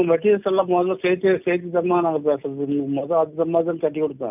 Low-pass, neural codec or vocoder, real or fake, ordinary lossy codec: 3.6 kHz; none; real; none